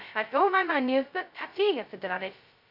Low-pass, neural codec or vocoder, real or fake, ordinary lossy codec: 5.4 kHz; codec, 16 kHz, 0.2 kbps, FocalCodec; fake; none